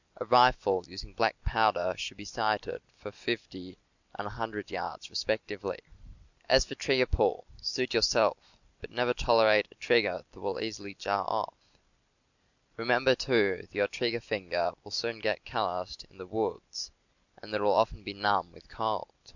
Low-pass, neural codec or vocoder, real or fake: 7.2 kHz; none; real